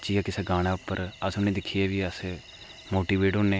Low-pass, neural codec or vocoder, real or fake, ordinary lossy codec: none; none; real; none